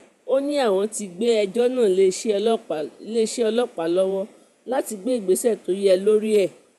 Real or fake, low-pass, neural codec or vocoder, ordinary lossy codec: fake; 14.4 kHz; vocoder, 48 kHz, 128 mel bands, Vocos; none